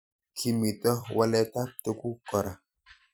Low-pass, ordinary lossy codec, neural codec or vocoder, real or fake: none; none; vocoder, 44.1 kHz, 128 mel bands every 256 samples, BigVGAN v2; fake